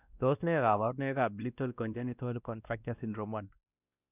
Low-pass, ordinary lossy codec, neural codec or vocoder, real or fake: 3.6 kHz; none; codec, 16 kHz, 1 kbps, X-Codec, WavLM features, trained on Multilingual LibriSpeech; fake